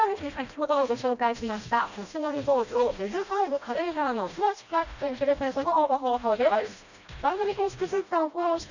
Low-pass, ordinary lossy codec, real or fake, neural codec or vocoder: 7.2 kHz; none; fake; codec, 16 kHz, 1 kbps, FreqCodec, smaller model